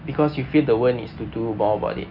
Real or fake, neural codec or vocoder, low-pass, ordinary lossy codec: real; none; 5.4 kHz; none